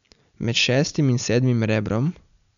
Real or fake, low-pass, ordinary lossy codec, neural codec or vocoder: real; 7.2 kHz; none; none